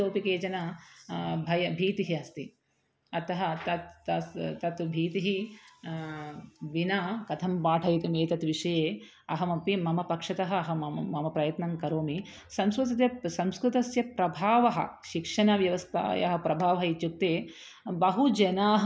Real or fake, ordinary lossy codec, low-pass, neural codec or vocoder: real; none; none; none